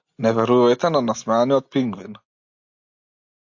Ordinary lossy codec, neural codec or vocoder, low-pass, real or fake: AAC, 48 kbps; none; 7.2 kHz; real